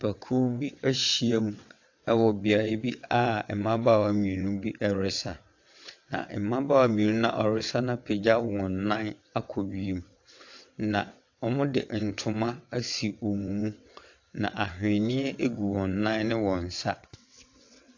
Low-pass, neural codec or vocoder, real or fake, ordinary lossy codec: 7.2 kHz; vocoder, 22.05 kHz, 80 mel bands, WaveNeXt; fake; AAC, 48 kbps